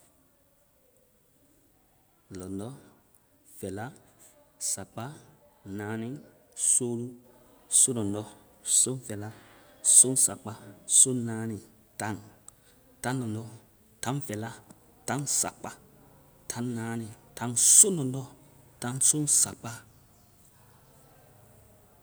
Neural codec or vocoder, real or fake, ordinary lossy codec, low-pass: none; real; none; none